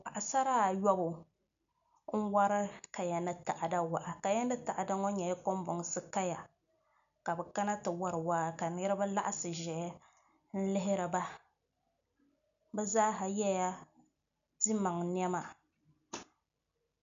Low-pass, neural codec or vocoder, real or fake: 7.2 kHz; none; real